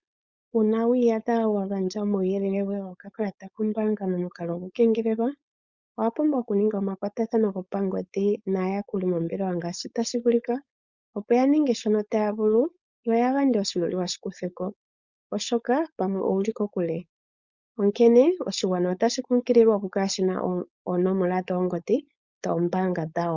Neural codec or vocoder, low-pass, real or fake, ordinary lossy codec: codec, 16 kHz, 4.8 kbps, FACodec; 7.2 kHz; fake; Opus, 64 kbps